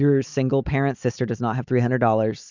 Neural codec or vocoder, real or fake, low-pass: none; real; 7.2 kHz